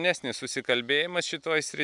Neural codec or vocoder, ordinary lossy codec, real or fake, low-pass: none; MP3, 96 kbps; real; 10.8 kHz